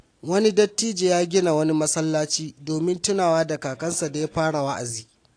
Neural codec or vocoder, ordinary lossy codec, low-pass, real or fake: none; AAC, 48 kbps; 9.9 kHz; real